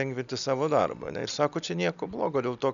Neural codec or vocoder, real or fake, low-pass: none; real; 7.2 kHz